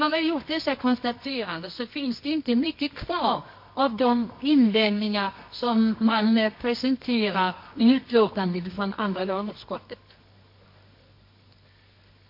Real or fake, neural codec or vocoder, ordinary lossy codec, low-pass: fake; codec, 24 kHz, 0.9 kbps, WavTokenizer, medium music audio release; MP3, 32 kbps; 5.4 kHz